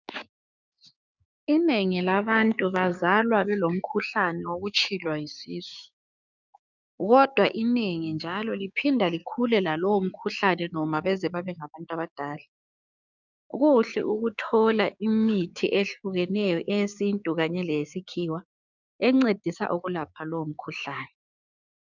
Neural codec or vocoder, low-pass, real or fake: codec, 16 kHz, 6 kbps, DAC; 7.2 kHz; fake